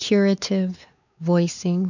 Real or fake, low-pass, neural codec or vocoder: fake; 7.2 kHz; codec, 16 kHz, 4 kbps, X-Codec, WavLM features, trained on Multilingual LibriSpeech